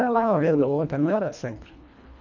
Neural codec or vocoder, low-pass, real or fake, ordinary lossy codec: codec, 24 kHz, 1.5 kbps, HILCodec; 7.2 kHz; fake; none